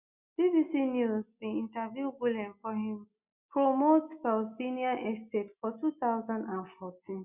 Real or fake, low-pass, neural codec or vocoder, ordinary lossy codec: real; 3.6 kHz; none; Opus, 64 kbps